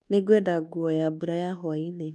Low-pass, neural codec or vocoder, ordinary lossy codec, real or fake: none; codec, 24 kHz, 1.2 kbps, DualCodec; none; fake